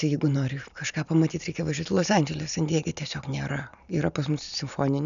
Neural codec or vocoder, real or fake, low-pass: none; real; 7.2 kHz